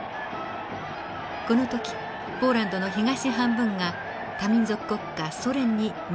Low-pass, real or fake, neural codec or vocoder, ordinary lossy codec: none; real; none; none